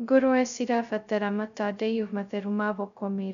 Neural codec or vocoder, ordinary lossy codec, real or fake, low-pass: codec, 16 kHz, 0.2 kbps, FocalCodec; none; fake; 7.2 kHz